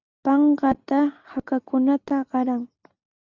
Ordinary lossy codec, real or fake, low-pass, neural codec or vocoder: Opus, 64 kbps; real; 7.2 kHz; none